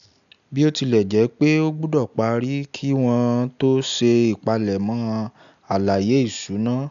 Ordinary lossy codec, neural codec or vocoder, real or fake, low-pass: none; none; real; 7.2 kHz